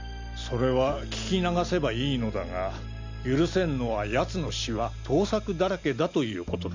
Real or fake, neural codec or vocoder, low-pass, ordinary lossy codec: real; none; 7.2 kHz; MP3, 64 kbps